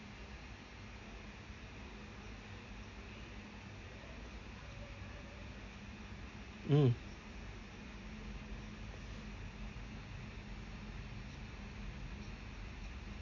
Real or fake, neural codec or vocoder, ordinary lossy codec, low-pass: real; none; MP3, 64 kbps; 7.2 kHz